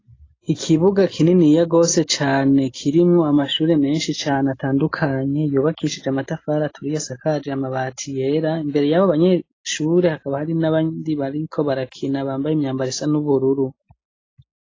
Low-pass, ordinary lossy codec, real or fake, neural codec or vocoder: 7.2 kHz; AAC, 32 kbps; real; none